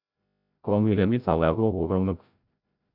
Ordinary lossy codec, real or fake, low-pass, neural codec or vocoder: none; fake; 5.4 kHz; codec, 16 kHz, 0.5 kbps, FreqCodec, larger model